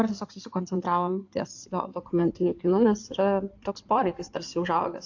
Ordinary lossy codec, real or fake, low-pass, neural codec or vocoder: AAC, 48 kbps; fake; 7.2 kHz; codec, 16 kHz in and 24 kHz out, 2.2 kbps, FireRedTTS-2 codec